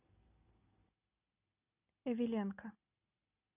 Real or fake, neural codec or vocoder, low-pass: real; none; 3.6 kHz